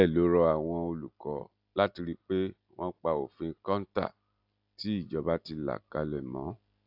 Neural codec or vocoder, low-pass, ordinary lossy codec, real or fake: none; 5.4 kHz; AAC, 48 kbps; real